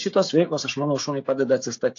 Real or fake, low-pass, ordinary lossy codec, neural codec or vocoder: fake; 7.2 kHz; AAC, 48 kbps; codec, 16 kHz, 16 kbps, FreqCodec, smaller model